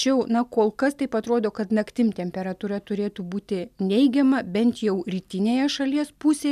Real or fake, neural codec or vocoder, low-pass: real; none; 14.4 kHz